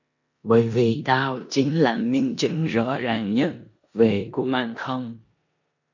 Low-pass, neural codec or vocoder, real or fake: 7.2 kHz; codec, 16 kHz in and 24 kHz out, 0.9 kbps, LongCat-Audio-Codec, four codebook decoder; fake